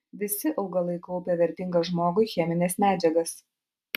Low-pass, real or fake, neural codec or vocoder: 14.4 kHz; real; none